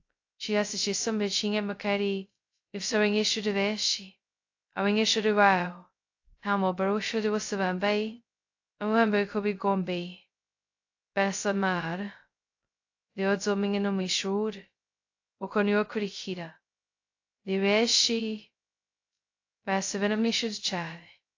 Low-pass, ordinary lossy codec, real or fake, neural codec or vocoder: 7.2 kHz; AAC, 48 kbps; fake; codec, 16 kHz, 0.2 kbps, FocalCodec